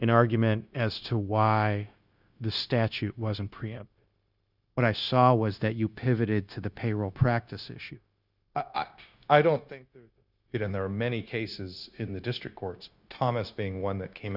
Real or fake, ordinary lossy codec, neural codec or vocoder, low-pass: fake; Opus, 64 kbps; codec, 16 kHz, 0.9 kbps, LongCat-Audio-Codec; 5.4 kHz